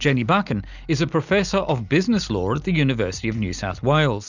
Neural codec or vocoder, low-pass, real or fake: none; 7.2 kHz; real